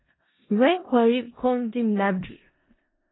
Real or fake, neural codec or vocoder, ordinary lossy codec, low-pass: fake; codec, 16 kHz in and 24 kHz out, 0.4 kbps, LongCat-Audio-Codec, four codebook decoder; AAC, 16 kbps; 7.2 kHz